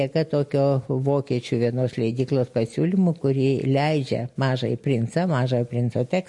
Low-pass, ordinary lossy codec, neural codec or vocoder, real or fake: 10.8 kHz; MP3, 48 kbps; none; real